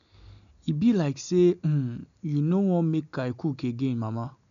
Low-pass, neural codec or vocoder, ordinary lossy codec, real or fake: 7.2 kHz; none; none; real